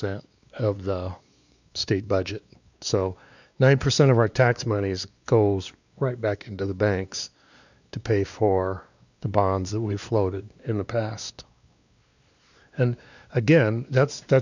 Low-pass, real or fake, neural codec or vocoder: 7.2 kHz; fake; codec, 16 kHz, 2 kbps, X-Codec, WavLM features, trained on Multilingual LibriSpeech